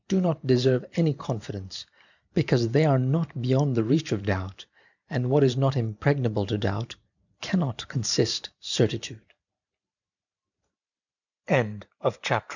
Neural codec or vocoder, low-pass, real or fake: vocoder, 44.1 kHz, 128 mel bands every 512 samples, BigVGAN v2; 7.2 kHz; fake